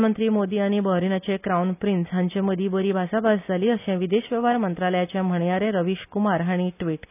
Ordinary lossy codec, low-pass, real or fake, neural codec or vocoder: none; 3.6 kHz; real; none